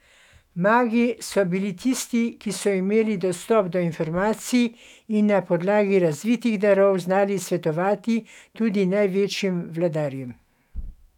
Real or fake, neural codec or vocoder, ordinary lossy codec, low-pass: fake; autoencoder, 48 kHz, 128 numbers a frame, DAC-VAE, trained on Japanese speech; none; 19.8 kHz